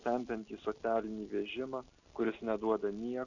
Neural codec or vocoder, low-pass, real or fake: none; 7.2 kHz; real